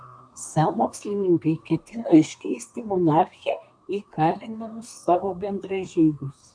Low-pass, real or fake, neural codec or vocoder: 9.9 kHz; fake; codec, 24 kHz, 1 kbps, SNAC